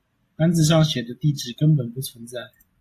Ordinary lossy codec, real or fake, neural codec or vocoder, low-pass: AAC, 64 kbps; real; none; 14.4 kHz